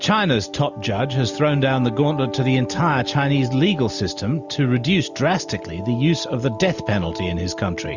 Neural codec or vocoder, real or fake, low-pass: none; real; 7.2 kHz